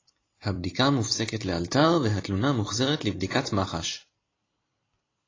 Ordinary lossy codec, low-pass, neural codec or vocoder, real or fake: AAC, 32 kbps; 7.2 kHz; vocoder, 44.1 kHz, 128 mel bands every 256 samples, BigVGAN v2; fake